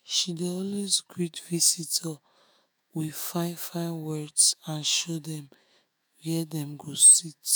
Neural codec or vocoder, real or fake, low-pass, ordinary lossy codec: autoencoder, 48 kHz, 128 numbers a frame, DAC-VAE, trained on Japanese speech; fake; none; none